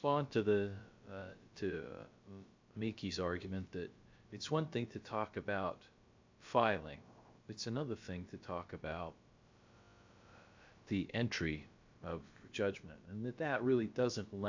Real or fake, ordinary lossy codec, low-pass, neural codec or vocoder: fake; MP3, 64 kbps; 7.2 kHz; codec, 16 kHz, about 1 kbps, DyCAST, with the encoder's durations